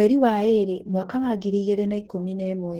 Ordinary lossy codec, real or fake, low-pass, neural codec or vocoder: Opus, 16 kbps; fake; 19.8 kHz; codec, 44.1 kHz, 2.6 kbps, DAC